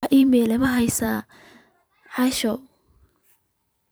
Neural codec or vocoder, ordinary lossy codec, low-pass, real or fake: vocoder, 44.1 kHz, 128 mel bands every 512 samples, BigVGAN v2; none; none; fake